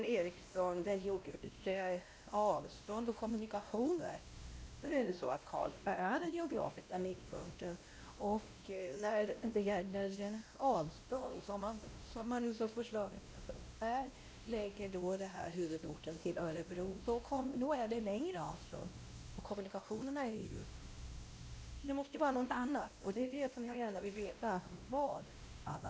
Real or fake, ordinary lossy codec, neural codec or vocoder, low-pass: fake; none; codec, 16 kHz, 1 kbps, X-Codec, WavLM features, trained on Multilingual LibriSpeech; none